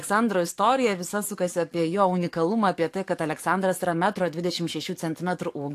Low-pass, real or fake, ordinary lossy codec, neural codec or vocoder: 14.4 kHz; fake; AAC, 64 kbps; codec, 44.1 kHz, 7.8 kbps, DAC